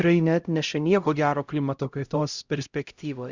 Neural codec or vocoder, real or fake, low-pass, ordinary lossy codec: codec, 16 kHz, 0.5 kbps, X-Codec, HuBERT features, trained on LibriSpeech; fake; 7.2 kHz; Opus, 64 kbps